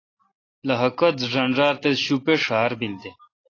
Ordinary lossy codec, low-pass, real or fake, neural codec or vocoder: AAC, 32 kbps; 7.2 kHz; real; none